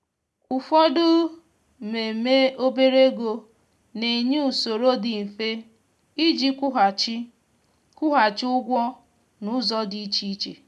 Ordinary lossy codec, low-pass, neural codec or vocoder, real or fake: none; none; none; real